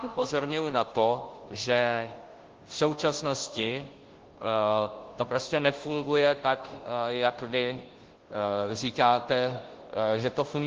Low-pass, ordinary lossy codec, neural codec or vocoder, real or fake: 7.2 kHz; Opus, 16 kbps; codec, 16 kHz, 0.5 kbps, FunCodec, trained on Chinese and English, 25 frames a second; fake